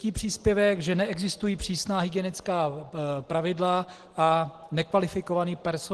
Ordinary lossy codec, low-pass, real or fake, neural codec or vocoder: Opus, 16 kbps; 10.8 kHz; real; none